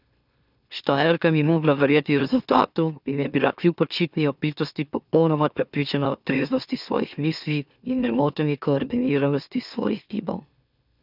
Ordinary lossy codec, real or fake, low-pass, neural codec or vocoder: none; fake; 5.4 kHz; autoencoder, 44.1 kHz, a latent of 192 numbers a frame, MeloTTS